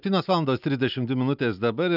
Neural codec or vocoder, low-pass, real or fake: none; 5.4 kHz; real